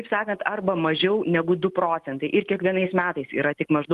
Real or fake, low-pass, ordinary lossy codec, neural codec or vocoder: real; 14.4 kHz; Opus, 24 kbps; none